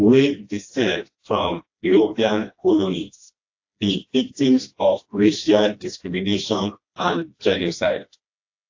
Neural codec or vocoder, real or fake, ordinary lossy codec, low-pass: codec, 16 kHz, 1 kbps, FreqCodec, smaller model; fake; AAC, 48 kbps; 7.2 kHz